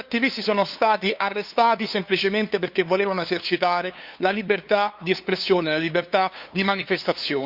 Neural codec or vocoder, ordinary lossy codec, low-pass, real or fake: codec, 16 kHz, 2 kbps, FunCodec, trained on LibriTTS, 25 frames a second; Opus, 64 kbps; 5.4 kHz; fake